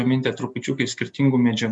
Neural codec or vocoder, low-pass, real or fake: none; 10.8 kHz; real